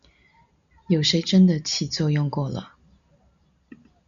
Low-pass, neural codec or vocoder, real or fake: 7.2 kHz; none; real